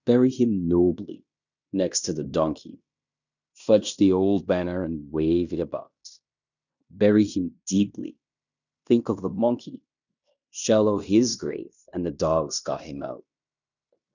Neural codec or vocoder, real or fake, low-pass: codec, 16 kHz in and 24 kHz out, 0.9 kbps, LongCat-Audio-Codec, fine tuned four codebook decoder; fake; 7.2 kHz